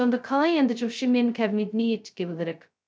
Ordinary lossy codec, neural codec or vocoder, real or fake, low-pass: none; codec, 16 kHz, 0.2 kbps, FocalCodec; fake; none